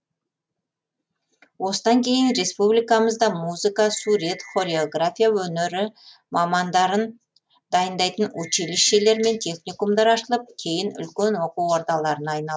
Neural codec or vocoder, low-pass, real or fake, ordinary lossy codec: none; none; real; none